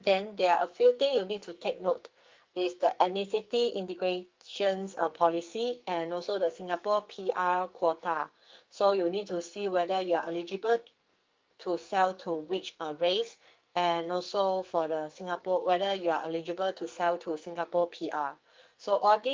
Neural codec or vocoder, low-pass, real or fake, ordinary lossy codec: codec, 44.1 kHz, 2.6 kbps, SNAC; 7.2 kHz; fake; Opus, 24 kbps